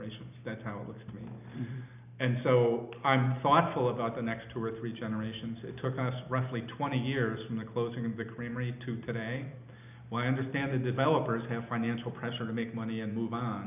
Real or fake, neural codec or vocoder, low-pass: real; none; 3.6 kHz